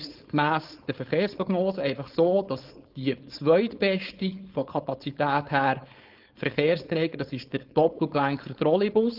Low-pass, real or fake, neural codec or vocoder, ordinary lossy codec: 5.4 kHz; fake; codec, 16 kHz, 4.8 kbps, FACodec; Opus, 24 kbps